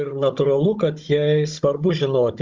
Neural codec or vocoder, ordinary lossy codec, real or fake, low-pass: codec, 16 kHz, 16 kbps, FunCodec, trained on Chinese and English, 50 frames a second; Opus, 32 kbps; fake; 7.2 kHz